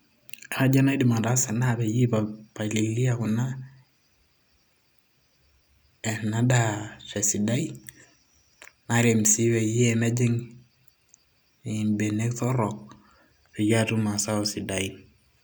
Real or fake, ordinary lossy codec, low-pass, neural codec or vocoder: real; none; none; none